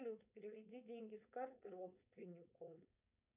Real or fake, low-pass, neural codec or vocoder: fake; 3.6 kHz; codec, 16 kHz, 4.8 kbps, FACodec